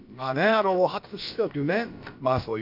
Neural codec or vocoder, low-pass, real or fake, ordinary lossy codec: codec, 16 kHz, about 1 kbps, DyCAST, with the encoder's durations; 5.4 kHz; fake; none